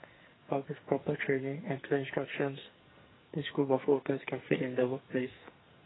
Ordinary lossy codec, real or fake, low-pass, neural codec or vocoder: AAC, 16 kbps; fake; 7.2 kHz; codec, 44.1 kHz, 2.6 kbps, SNAC